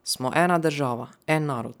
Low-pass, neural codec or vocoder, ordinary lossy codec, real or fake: none; none; none; real